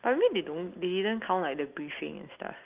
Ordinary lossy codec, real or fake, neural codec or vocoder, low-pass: Opus, 32 kbps; real; none; 3.6 kHz